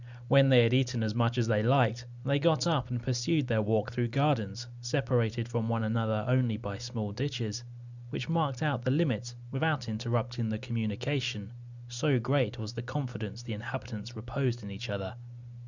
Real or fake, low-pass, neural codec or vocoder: real; 7.2 kHz; none